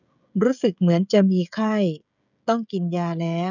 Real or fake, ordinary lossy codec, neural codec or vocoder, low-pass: fake; none; codec, 16 kHz, 16 kbps, FreqCodec, smaller model; 7.2 kHz